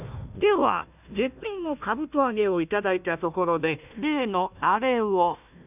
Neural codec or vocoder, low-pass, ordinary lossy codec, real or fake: codec, 16 kHz, 1 kbps, FunCodec, trained on Chinese and English, 50 frames a second; 3.6 kHz; none; fake